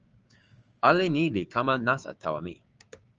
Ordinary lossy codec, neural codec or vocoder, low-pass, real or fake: Opus, 24 kbps; codec, 16 kHz, 6 kbps, DAC; 7.2 kHz; fake